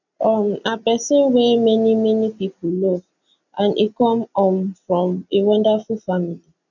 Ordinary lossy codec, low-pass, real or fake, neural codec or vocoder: none; 7.2 kHz; real; none